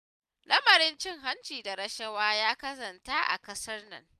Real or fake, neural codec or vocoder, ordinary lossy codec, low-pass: real; none; none; 14.4 kHz